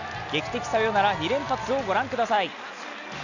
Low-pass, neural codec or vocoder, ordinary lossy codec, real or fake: 7.2 kHz; none; none; real